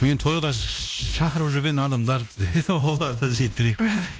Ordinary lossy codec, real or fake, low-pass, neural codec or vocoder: none; fake; none; codec, 16 kHz, 1 kbps, X-Codec, WavLM features, trained on Multilingual LibriSpeech